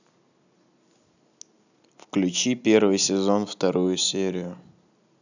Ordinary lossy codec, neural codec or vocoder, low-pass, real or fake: none; none; 7.2 kHz; real